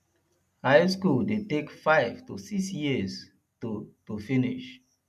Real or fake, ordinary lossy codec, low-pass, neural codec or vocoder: real; none; 14.4 kHz; none